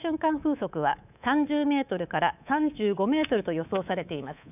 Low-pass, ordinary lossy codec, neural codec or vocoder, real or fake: 3.6 kHz; none; codec, 24 kHz, 3.1 kbps, DualCodec; fake